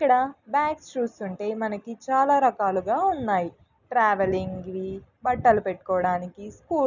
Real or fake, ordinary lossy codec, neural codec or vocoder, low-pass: real; none; none; 7.2 kHz